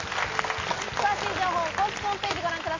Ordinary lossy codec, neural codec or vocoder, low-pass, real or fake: MP3, 32 kbps; none; 7.2 kHz; real